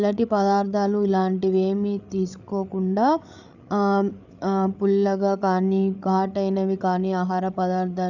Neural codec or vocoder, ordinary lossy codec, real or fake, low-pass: codec, 16 kHz, 8 kbps, FreqCodec, larger model; none; fake; 7.2 kHz